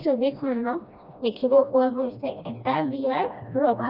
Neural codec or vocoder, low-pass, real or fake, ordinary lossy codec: codec, 16 kHz, 1 kbps, FreqCodec, smaller model; 5.4 kHz; fake; none